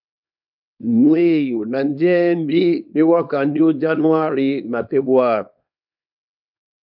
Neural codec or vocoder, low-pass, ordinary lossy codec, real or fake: codec, 24 kHz, 0.9 kbps, WavTokenizer, small release; 5.4 kHz; MP3, 48 kbps; fake